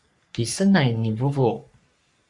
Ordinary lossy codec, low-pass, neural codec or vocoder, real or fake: Opus, 64 kbps; 10.8 kHz; codec, 44.1 kHz, 3.4 kbps, Pupu-Codec; fake